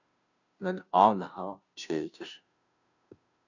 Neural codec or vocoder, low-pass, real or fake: codec, 16 kHz, 0.5 kbps, FunCodec, trained on Chinese and English, 25 frames a second; 7.2 kHz; fake